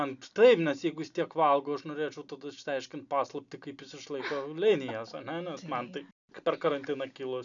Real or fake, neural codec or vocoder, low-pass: real; none; 7.2 kHz